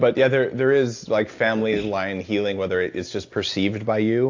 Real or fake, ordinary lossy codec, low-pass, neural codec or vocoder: real; AAC, 48 kbps; 7.2 kHz; none